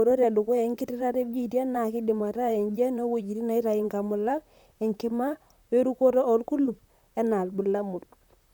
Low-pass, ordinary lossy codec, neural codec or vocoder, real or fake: 19.8 kHz; none; vocoder, 44.1 kHz, 128 mel bands, Pupu-Vocoder; fake